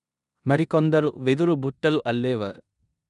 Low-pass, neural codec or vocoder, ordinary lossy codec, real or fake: 10.8 kHz; codec, 16 kHz in and 24 kHz out, 0.9 kbps, LongCat-Audio-Codec, four codebook decoder; none; fake